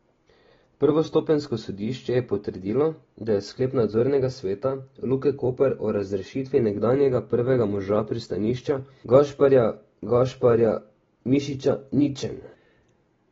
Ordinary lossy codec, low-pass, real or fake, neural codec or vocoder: AAC, 24 kbps; 7.2 kHz; real; none